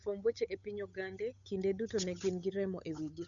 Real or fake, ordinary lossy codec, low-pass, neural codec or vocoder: fake; none; 7.2 kHz; codec, 16 kHz, 8 kbps, FunCodec, trained on Chinese and English, 25 frames a second